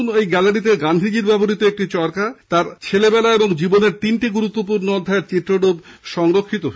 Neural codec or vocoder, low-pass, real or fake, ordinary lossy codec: none; none; real; none